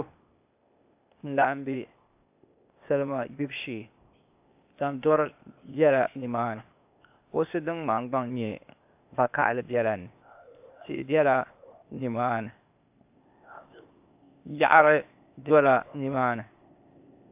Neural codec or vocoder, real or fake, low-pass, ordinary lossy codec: codec, 16 kHz, 0.8 kbps, ZipCodec; fake; 3.6 kHz; AAC, 32 kbps